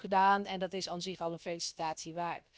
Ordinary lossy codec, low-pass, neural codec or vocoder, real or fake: none; none; codec, 16 kHz, about 1 kbps, DyCAST, with the encoder's durations; fake